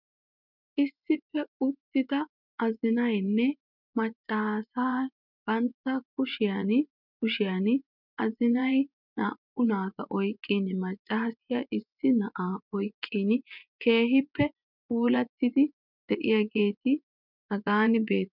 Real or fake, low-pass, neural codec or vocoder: fake; 5.4 kHz; vocoder, 24 kHz, 100 mel bands, Vocos